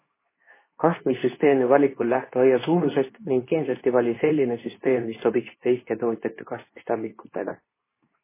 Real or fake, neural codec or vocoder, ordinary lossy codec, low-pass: fake; codec, 24 kHz, 0.9 kbps, WavTokenizer, medium speech release version 2; MP3, 16 kbps; 3.6 kHz